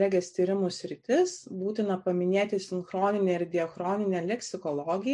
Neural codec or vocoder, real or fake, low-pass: none; real; 10.8 kHz